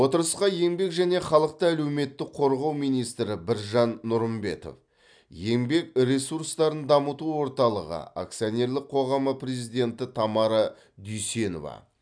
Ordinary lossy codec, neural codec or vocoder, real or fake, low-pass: none; none; real; 9.9 kHz